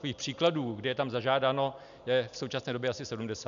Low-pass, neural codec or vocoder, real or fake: 7.2 kHz; none; real